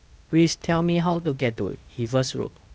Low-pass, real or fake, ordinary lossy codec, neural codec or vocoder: none; fake; none; codec, 16 kHz, 0.8 kbps, ZipCodec